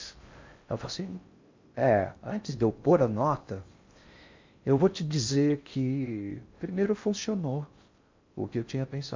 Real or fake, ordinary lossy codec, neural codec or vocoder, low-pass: fake; MP3, 48 kbps; codec, 16 kHz in and 24 kHz out, 0.6 kbps, FocalCodec, streaming, 4096 codes; 7.2 kHz